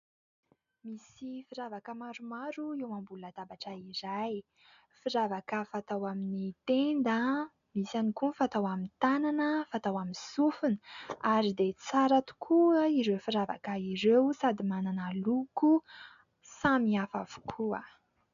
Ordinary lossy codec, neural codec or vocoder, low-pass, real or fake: MP3, 96 kbps; none; 7.2 kHz; real